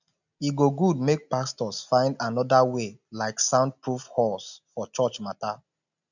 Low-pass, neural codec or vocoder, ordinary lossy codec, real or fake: 7.2 kHz; none; none; real